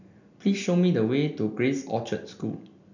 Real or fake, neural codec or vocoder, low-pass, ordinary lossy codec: real; none; 7.2 kHz; none